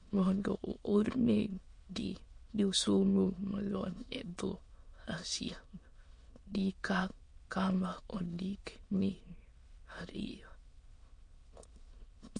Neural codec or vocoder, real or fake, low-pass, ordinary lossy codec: autoencoder, 22.05 kHz, a latent of 192 numbers a frame, VITS, trained on many speakers; fake; 9.9 kHz; MP3, 48 kbps